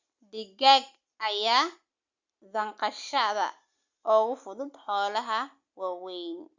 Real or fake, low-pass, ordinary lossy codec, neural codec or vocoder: real; 7.2 kHz; Opus, 64 kbps; none